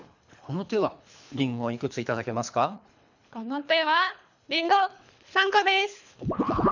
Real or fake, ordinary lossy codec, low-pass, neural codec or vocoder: fake; none; 7.2 kHz; codec, 24 kHz, 3 kbps, HILCodec